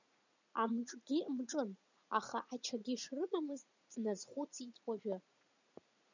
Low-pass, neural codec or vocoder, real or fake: 7.2 kHz; vocoder, 44.1 kHz, 80 mel bands, Vocos; fake